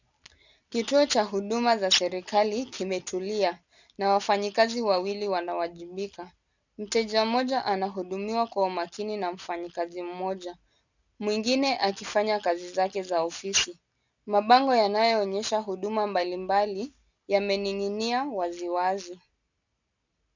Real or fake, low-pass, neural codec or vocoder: real; 7.2 kHz; none